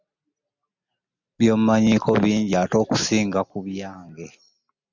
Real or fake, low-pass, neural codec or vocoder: real; 7.2 kHz; none